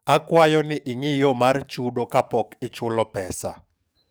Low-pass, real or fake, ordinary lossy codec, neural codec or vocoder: none; fake; none; codec, 44.1 kHz, 7.8 kbps, DAC